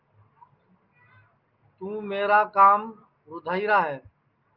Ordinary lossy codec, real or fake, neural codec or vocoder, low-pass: Opus, 24 kbps; real; none; 5.4 kHz